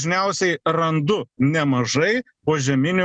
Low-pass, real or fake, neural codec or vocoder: 9.9 kHz; real; none